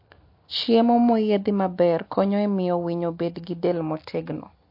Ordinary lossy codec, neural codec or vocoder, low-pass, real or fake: MP3, 32 kbps; none; 5.4 kHz; real